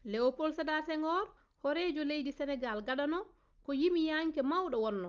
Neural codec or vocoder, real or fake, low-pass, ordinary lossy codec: none; real; 7.2 kHz; Opus, 32 kbps